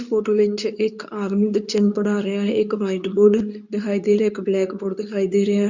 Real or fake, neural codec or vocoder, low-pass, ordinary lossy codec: fake; codec, 24 kHz, 0.9 kbps, WavTokenizer, medium speech release version 2; 7.2 kHz; none